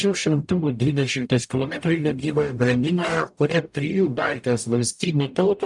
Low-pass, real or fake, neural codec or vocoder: 10.8 kHz; fake; codec, 44.1 kHz, 0.9 kbps, DAC